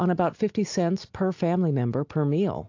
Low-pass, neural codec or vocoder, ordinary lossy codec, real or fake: 7.2 kHz; none; AAC, 48 kbps; real